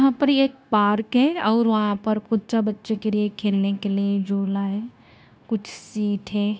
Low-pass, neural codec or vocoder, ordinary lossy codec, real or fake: none; codec, 16 kHz, 0.9 kbps, LongCat-Audio-Codec; none; fake